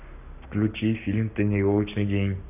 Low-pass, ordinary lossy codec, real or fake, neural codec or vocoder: 3.6 kHz; none; fake; codec, 44.1 kHz, 7.8 kbps, Pupu-Codec